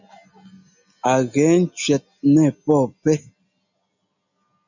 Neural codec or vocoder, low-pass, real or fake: none; 7.2 kHz; real